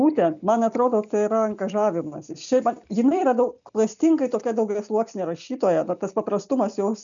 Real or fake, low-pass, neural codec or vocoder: real; 7.2 kHz; none